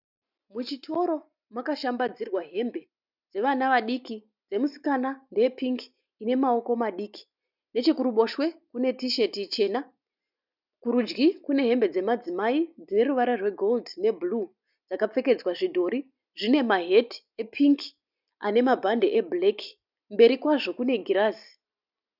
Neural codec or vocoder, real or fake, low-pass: none; real; 5.4 kHz